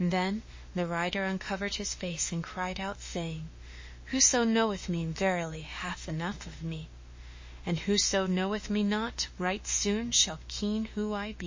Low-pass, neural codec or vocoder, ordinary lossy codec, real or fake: 7.2 kHz; autoencoder, 48 kHz, 32 numbers a frame, DAC-VAE, trained on Japanese speech; MP3, 32 kbps; fake